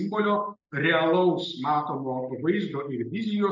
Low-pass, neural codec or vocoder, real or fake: 7.2 kHz; none; real